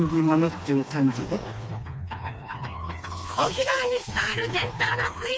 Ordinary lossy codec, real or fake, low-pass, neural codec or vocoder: none; fake; none; codec, 16 kHz, 2 kbps, FreqCodec, smaller model